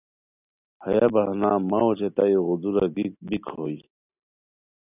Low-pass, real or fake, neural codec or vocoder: 3.6 kHz; real; none